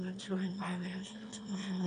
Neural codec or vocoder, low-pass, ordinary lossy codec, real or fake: autoencoder, 22.05 kHz, a latent of 192 numbers a frame, VITS, trained on one speaker; 9.9 kHz; MP3, 96 kbps; fake